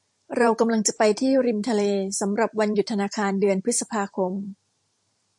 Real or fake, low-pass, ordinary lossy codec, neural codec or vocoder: fake; 10.8 kHz; MP3, 48 kbps; vocoder, 44.1 kHz, 128 mel bands every 512 samples, BigVGAN v2